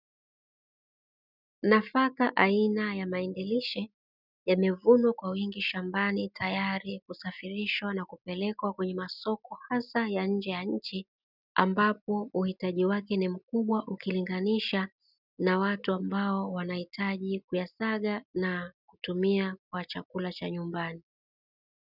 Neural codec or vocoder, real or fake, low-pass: none; real; 5.4 kHz